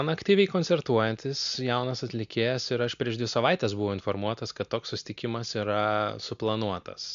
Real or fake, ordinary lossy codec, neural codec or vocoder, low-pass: real; MP3, 64 kbps; none; 7.2 kHz